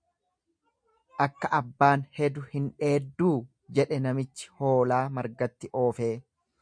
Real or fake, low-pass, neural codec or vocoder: real; 9.9 kHz; none